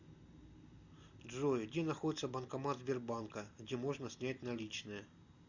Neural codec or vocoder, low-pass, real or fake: none; 7.2 kHz; real